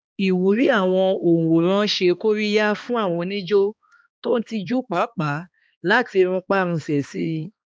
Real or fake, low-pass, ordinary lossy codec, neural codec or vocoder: fake; none; none; codec, 16 kHz, 2 kbps, X-Codec, HuBERT features, trained on balanced general audio